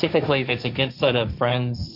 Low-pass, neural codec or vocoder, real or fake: 5.4 kHz; codec, 16 kHz, 1.1 kbps, Voila-Tokenizer; fake